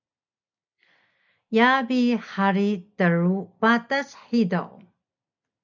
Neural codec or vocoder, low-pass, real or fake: none; 7.2 kHz; real